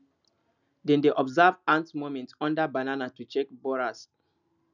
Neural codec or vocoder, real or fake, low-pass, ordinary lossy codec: none; real; 7.2 kHz; none